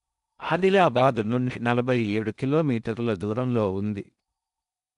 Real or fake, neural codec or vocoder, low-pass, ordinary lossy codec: fake; codec, 16 kHz in and 24 kHz out, 0.6 kbps, FocalCodec, streaming, 4096 codes; 10.8 kHz; none